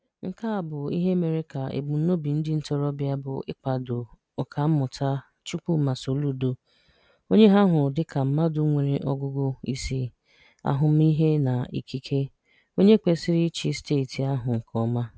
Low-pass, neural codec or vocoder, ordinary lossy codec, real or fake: none; none; none; real